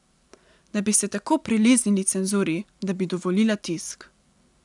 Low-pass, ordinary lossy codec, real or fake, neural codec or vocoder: 10.8 kHz; none; real; none